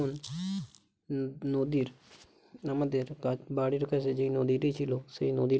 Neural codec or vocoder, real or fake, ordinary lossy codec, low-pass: none; real; none; none